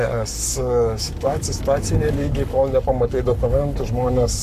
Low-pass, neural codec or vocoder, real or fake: 14.4 kHz; codec, 44.1 kHz, 7.8 kbps, Pupu-Codec; fake